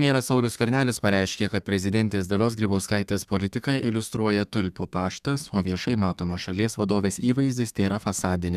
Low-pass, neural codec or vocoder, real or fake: 14.4 kHz; codec, 32 kHz, 1.9 kbps, SNAC; fake